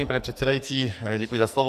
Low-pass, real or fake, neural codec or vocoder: 14.4 kHz; fake; codec, 44.1 kHz, 2.6 kbps, SNAC